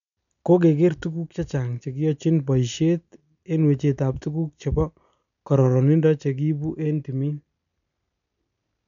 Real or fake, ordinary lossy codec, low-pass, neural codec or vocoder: real; none; 7.2 kHz; none